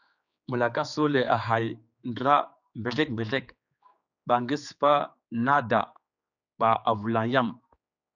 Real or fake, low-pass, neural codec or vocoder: fake; 7.2 kHz; codec, 16 kHz, 4 kbps, X-Codec, HuBERT features, trained on general audio